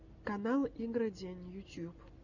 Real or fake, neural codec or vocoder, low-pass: real; none; 7.2 kHz